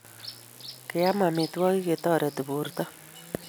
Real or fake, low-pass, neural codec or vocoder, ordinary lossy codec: real; none; none; none